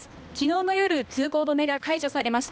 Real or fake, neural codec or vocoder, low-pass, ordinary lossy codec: fake; codec, 16 kHz, 1 kbps, X-Codec, HuBERT features, trained on balanced general audio; none; none